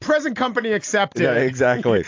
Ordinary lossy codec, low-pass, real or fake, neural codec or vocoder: AAC, 48 kbps; 7.2 kHz; real; none